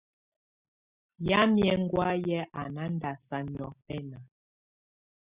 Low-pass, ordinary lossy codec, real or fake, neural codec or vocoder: 3.6 kHz; Opus, 64 kbps; real; none